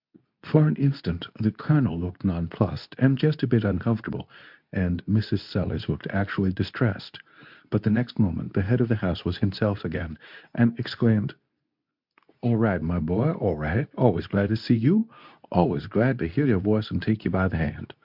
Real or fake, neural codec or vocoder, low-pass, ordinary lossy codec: fake; codec, 24 kHz, 0.9 kbps, WavTokenizer, medium speech release version 2; 5.4 kHz; MP3, 48 kbps